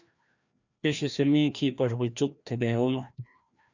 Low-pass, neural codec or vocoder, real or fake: 7.2 kHz; codec, 16 kHz, 1 kbps, FreqCodec, larger model; fake